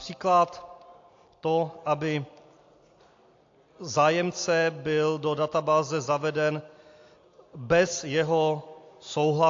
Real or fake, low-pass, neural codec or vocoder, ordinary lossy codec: real; 7.2 kHz; none; AAC, 48 kbps